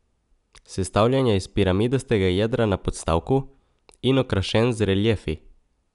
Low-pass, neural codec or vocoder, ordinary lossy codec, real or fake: 10.8 kHz; none; none; real